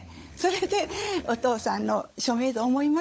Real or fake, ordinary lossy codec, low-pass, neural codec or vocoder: fake; none; none; codec, 16 kHz, 16 kbps, FunCodec, trained on LibriTTS, 50 frames a second